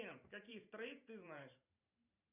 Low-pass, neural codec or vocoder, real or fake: 3.6 kHz; vocoder, 44.1 kHz, 128 mel bands every 512 samples, BigVGAN v2; fake